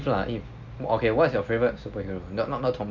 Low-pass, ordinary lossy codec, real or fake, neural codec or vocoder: 7.2 kHz; none; real; none